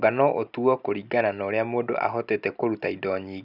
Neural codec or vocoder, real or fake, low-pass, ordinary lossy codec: none; real; 5.4 kHz; none